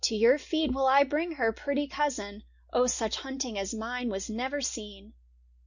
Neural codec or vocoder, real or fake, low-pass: none; real; 7.2 kHz